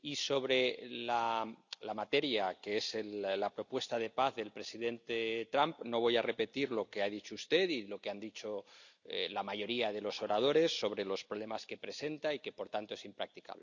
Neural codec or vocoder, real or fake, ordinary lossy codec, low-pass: none; real; none; 7.2 kHz